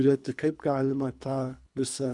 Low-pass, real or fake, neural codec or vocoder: 10.8 kHz; fake; codec, 24 kHz, 3 kbps, HILCodec